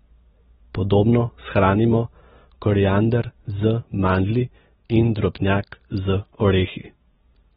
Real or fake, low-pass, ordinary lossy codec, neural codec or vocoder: real; 9.9 kHz; AAC, 16 kbps; none